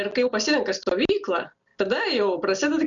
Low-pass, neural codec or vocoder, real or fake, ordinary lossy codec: 7.2 kHz; none; real; MP3, 96 kbps